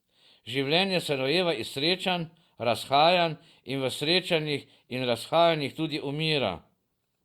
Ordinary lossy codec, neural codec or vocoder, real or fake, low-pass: Opus, 64 kbps; none; real; 19.8 kHz